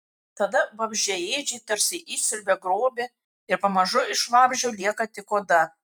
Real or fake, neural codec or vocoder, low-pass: fake; vocoder, 44.1 kHz, 128 mel bands every 512 samples, BigVGAN v2; 19.8 kHz